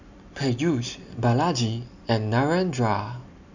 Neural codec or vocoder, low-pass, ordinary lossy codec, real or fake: none; 7.2 kHz; none; real